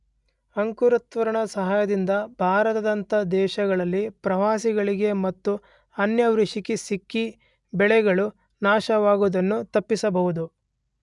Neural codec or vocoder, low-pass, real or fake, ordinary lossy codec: none; 10.8 kHz; real; none